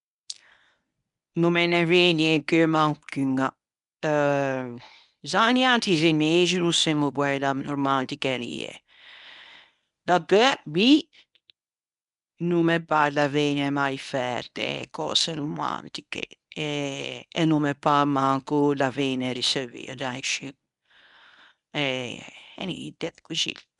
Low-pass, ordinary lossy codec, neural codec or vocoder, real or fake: 10.8 kHz; none; codec, 24 kHz, 0.9 kbps, WavTokenizer, medium speech release version 1; fake